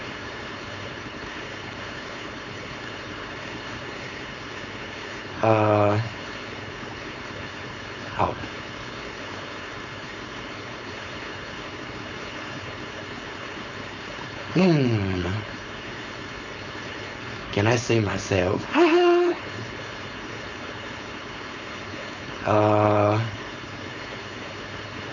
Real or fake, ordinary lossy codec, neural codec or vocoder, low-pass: fake; AAC, 48 kbps; codec, 16 kHz, 4.8 kbps, FACodec; 7.2 kHz